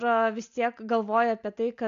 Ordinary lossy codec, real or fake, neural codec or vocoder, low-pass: MP3, 96 kbps; real; none; 7.2 kHz